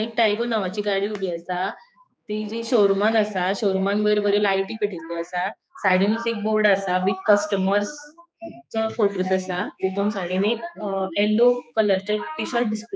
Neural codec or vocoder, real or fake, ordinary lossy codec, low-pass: codec, 16 kHz, 4 kbps, X-Codec, HuBERT features, trained on general audio; fake; none; none